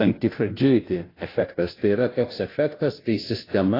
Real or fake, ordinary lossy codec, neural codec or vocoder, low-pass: fake; AAC, 24 kbps; codec, 16 kHz, 0.5 kbps, FunCodec, trained on Chinese and English, 25 frames a second; 5.4 kHz